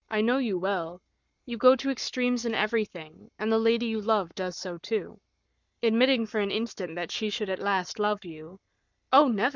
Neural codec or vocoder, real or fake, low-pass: codec, 44.1 kHz, 7.8 kbps, Pupu-Codec; fake; 7.2 kHz